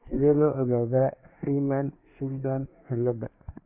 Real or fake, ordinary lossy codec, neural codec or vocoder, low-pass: fake; AAC, 32 kbps; codec, 24 kHz, 1 kbps, SNAC; 3.6 kHz